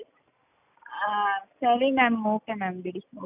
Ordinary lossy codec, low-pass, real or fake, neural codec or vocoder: none; 3.6 kHz; real; none